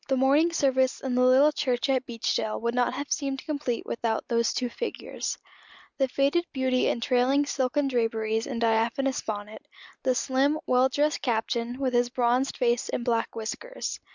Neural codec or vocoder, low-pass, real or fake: none; 7.2 kHz; real